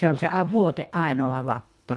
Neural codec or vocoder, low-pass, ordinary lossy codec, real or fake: codec, 24 kHz, 1.5 kbps, HILCodec; none; none; fake